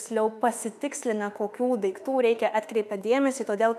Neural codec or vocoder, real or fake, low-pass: autoencoder, 48 kHz, 32 numbers a frame, DAC-VAE, trained on Japanese speech; fake; 14.4 kHz